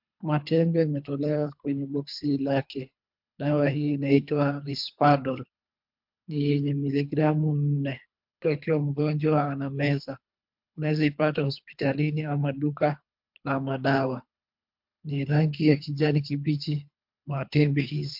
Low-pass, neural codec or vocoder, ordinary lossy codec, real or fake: 5.4 kHz; codec, 24 kHz, 3 kbps, HILCodec; MP3, 48 kbps; fake